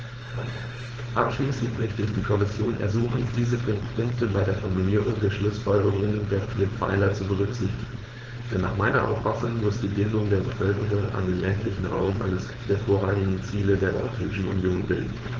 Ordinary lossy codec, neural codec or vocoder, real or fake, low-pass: Opus, 16 kbps; codec, 16 kHz, 4.8 kbps, FACodec; fake; 7.2 kHz